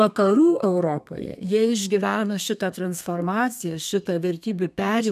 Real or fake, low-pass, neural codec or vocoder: fake; 14.4 kHz; codec, 32 kHz, 1.9 kbps, SNAC